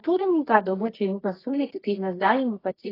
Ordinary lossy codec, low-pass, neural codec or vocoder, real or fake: AAC, 24 kbps; 5.4 kHz; codec, 24 kHz, 0.9 kbps, WavTokenizer, medium music audio release; fake